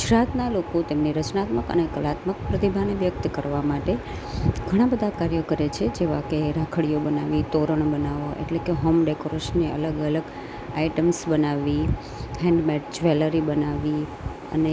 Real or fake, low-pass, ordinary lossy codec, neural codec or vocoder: real; none; none; none